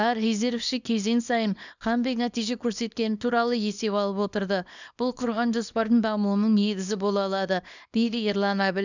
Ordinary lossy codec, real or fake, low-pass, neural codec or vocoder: none; fake; 7.2 kHz; codec, 24 kHz, 0.9 kbps, WavTokenizer, small release